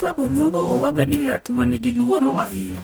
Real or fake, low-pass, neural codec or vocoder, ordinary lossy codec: fake; none; codec, 44.1 kHz, 0.9 kbps, DAC; none